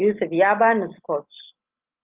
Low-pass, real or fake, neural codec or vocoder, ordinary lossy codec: 3.6 kHz; real; none; Opus, 24 kbps